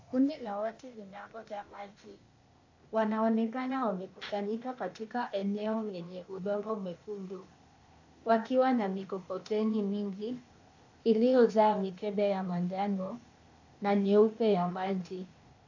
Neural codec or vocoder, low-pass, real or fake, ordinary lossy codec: codec, 16 kHz, 0.8 kbps, ZipCodec; 7.2 kHz; fake; AAC, 48 kbps